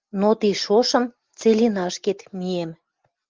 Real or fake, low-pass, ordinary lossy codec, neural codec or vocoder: real; 7.2 kHz; Opus, 24 kbps; none